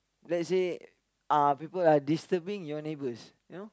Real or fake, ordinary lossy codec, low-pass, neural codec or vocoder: real; none; none; none